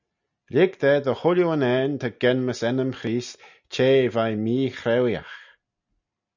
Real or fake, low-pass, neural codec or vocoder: real; 7.2 kHz; none